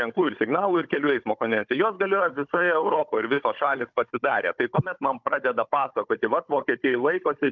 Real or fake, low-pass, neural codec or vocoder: fake; 7.2 kHz; codec, 16 kHz, 16 kbps, FunCodec, trained on Chinese and English, 50 frames a second